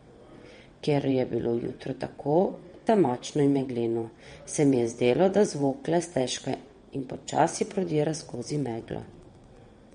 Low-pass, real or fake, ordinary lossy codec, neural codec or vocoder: 9.9 kHz; fake; MP3, 48 kbps; vocoder, 22.05 kHz, 80 mel bands, WaveNeXt